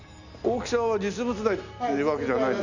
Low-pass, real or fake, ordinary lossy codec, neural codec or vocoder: 7.2 kHz; real; none; none